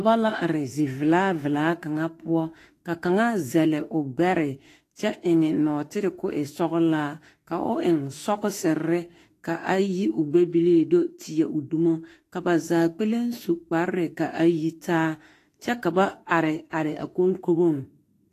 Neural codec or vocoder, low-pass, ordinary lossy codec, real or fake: autoencoder, 48 kHz, 32 numbers a frame, DAC-VAE, trained on Japanese speech; 14.4 kHz; AAC, 48 kbps; fake